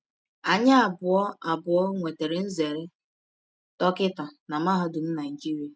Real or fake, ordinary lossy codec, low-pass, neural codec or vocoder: real; none; none; none